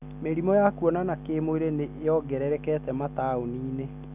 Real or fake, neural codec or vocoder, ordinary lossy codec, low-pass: real; none; none; 3.6 kHz